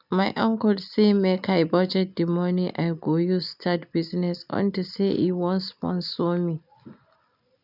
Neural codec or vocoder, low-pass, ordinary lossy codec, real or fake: none; 5.4 kHz; none; real